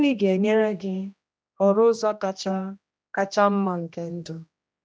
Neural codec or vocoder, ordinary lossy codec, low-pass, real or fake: codec, 16 kHz, 1 kbps, X-Codec, HuBERT features, trained on general audio; none; none; fake